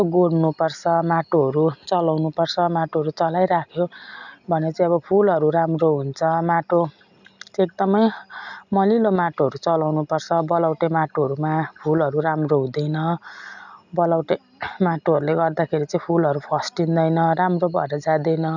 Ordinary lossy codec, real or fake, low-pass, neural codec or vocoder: none; real; 7.2 kHz; none